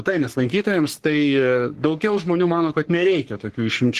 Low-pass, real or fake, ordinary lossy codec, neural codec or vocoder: 14.4 kHz; fake; Opus, 16 kbps; codec, 44.1 kHz, 3.4 kbps, Pupu-Codec